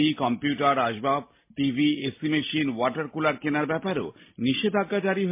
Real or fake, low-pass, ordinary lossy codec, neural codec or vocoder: real; 3.6 kHz; MP3, 24 kbps; none